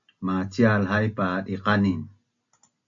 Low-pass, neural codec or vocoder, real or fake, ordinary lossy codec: 7.2 kHz; none; real; AAC, 64 kbps